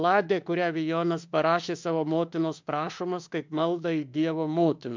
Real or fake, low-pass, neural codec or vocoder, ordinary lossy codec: fake; 7.2 kHz; autoencoder, 48 kHz, 32 numbers a frame, DAC-VAE, trained on Japanese speech; MP3, 64 kbps